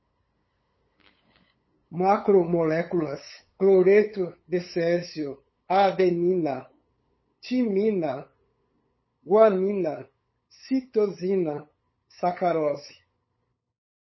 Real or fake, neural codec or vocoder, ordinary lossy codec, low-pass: fake; codec, 16 kHz, 8 kbps, FunCodec, trained on LibriTTS, 25 frames a second; MP3, 24 kbps; 7.2 kHz